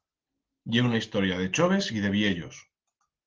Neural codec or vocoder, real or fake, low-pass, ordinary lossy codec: none; real; 7.2 kHz; Opus, 16 kbps